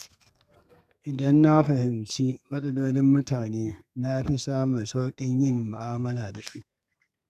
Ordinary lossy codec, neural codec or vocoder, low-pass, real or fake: none; codec, 32 kHz, 1.9 kbps, SNAC; 14.4 kHz; fake